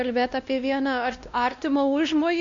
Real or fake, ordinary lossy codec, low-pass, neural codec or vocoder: fake; MP3, 64 kbps; 7.2 kHz; codec, 16 kHz, 1 kbps, X-Codec, WavLM features, trained on Multilingual LibriSpeech